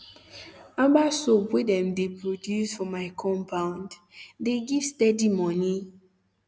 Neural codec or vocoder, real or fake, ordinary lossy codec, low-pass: none; real; none; none